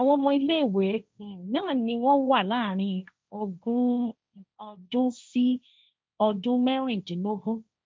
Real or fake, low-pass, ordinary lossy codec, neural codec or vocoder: fake; none; none; codec, 16 kHz, 1.1 kbps, Voila-Tokenizer